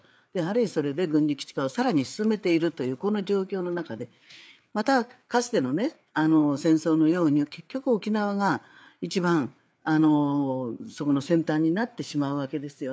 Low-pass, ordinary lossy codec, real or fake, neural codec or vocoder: none; none; fake; codec, 16 kHz, 4 kbps, FreqCodec, larger model